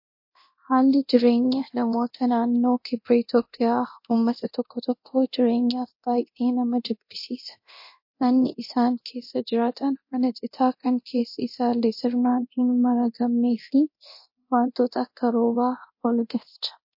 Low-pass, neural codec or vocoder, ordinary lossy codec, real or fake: 5.4 kHz; codec, 24 kHz, 0.9 kbps, DualCodec; MP3, 32 kbps; fake